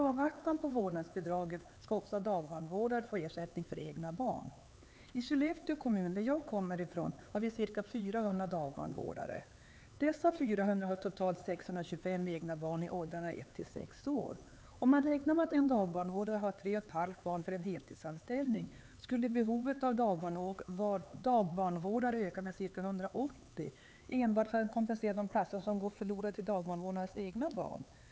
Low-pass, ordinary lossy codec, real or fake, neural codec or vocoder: none; none; fake; codec, 16 kHz, 4 kbps, X-Codec, HuBERT features, trained on LibriSpeech